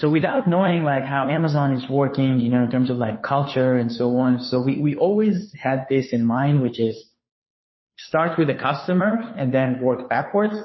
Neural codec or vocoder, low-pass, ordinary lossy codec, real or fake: codec, 16 kHz, 2 kbps, FunCodec, trained on Chinese and English, 25 frames a second; 7.2 kHz; MP3, 24 kbps; fake